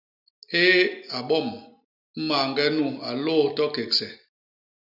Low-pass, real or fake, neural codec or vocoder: 5.4 kHz; real; none